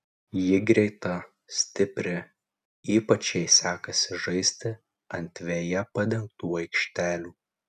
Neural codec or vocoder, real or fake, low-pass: none; real; 14.4 kHz